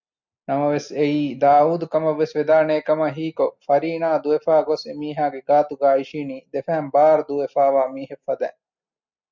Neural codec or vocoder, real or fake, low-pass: none; real; 7.2 kHz